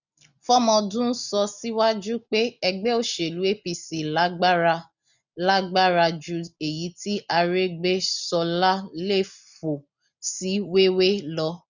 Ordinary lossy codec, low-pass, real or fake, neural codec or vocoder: none; 7.2 kHz; real; none